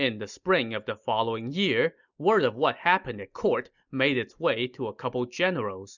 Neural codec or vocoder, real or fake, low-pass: none; real; 7.2 kHz